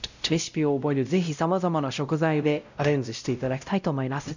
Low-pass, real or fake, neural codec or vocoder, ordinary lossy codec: 7.2 kHz; fake; codec, 16 kHz, 0.5 kbps, X-Codec, WavLM features, trained on Multilingual LibriSpeech; none